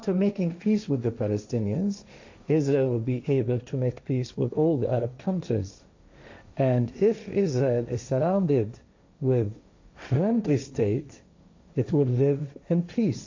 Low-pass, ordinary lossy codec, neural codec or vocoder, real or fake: 7.2 kHz; AAC, 48 kbps; codec, 16 kHz, 1.1 kbps, Voila-Tokenizer; fake